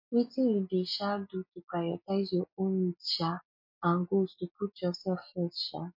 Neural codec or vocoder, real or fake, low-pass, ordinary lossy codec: none; real; 5.4 kHz; MP3, 32 kbps